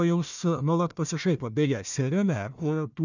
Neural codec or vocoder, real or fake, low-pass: codec, 16 kHz, 1 kbps, FunCodec, trained on Chinese and English, 50 frames a second; fake; 7.2 kHz